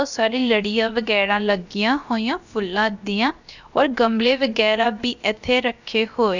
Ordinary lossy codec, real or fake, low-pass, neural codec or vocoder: none; fake; 7.2 kHz; codec, 16 kHz, about 1 kbps, DyCAST, with the encoder's durations